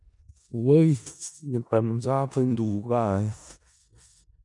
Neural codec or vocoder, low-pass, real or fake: codec, 16 kHz in and 24 kHz out, 0.4 kbps, LongCat-Audio-Codec, four codebook decoder; 10.8 kHz; fake